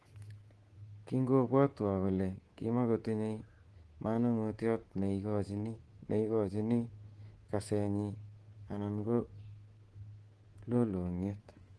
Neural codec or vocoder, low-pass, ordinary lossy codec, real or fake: codec, 24 kHz, 3.1 kbps, DualCodec; 10.8 kHz; Opus, 16 kbps; fake